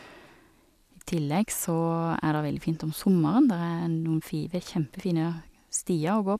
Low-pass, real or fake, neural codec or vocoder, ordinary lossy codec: 14.4 kHz; real; none; none